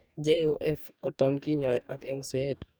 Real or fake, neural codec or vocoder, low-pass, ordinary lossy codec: fake; codec, 44.1 kHz, 2.6 kbps, DAC; none; none